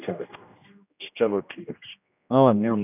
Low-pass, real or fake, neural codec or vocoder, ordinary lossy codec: 3.6 kHz; fake; codec, 16 kHz, 1 kbps, X-Codec, HuBERT features, trained on general audio; none